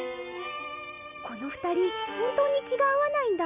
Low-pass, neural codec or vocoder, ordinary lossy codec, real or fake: 3.6 kHz; none; none; real